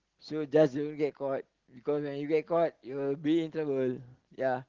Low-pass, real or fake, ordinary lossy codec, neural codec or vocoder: 7.2 kHz; real; Opus, 16 kbps; none